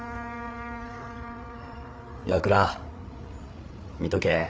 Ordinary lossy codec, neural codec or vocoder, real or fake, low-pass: none; codec, 16 kHz, 8 kbps, FreqCodec, larger model; fake; none